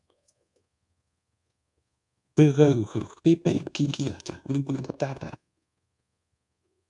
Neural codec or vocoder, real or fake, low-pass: codec, 24 kHz, 1.2 kbps, DualCodec; fake; 10.8 kHz